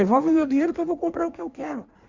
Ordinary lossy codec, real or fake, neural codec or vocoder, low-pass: Opus, 64 kbps; fake; codec, 16 kHz in and 24 kHz out, 1.1 kbps, FireRedTTS-2 codec; 7.2 kHz